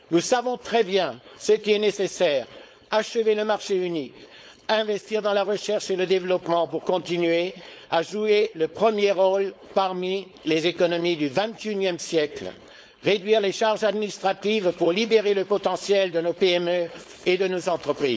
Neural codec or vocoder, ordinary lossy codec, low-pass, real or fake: codec, 16 kHz, 4.8 kbps, FACodec; none; none; fake